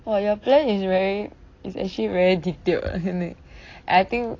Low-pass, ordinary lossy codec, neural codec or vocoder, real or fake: 7.2 kHz; AAC, 32 kbps; none; real